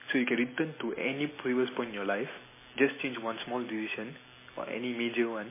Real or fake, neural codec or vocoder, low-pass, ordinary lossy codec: real; none; 3.6 kHz; MP3, 16 kbps